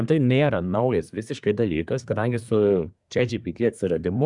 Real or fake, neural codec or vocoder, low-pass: fake; codec, 24 kHz, 1 kbps, SNAC; 10.8 kHz